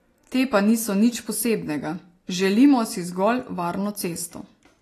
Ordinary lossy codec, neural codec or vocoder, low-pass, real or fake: AAC, 48 kbps; none; 14.4 kHz; real